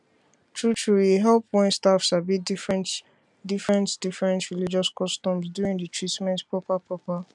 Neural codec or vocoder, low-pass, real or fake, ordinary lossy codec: none; 10.8 kHz; real; none